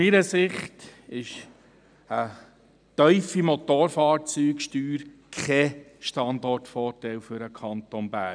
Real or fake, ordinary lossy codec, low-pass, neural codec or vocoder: real; none; 9.9 kHz; none